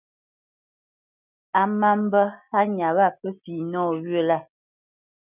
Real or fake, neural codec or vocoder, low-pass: real; none; 3.6 kHz